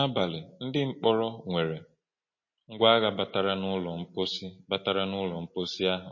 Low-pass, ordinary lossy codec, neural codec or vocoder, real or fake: 7.2 kHz; MP3, 32 kbps; none; real